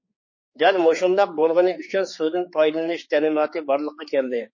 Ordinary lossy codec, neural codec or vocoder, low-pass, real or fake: MP3, 32 kbps; codec, 16 kHz, 4 kbps, X-Codec, HuBERT features, trained on general audio; 7.2 kHz; fake